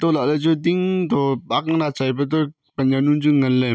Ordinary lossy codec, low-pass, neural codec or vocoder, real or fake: none; none; none; real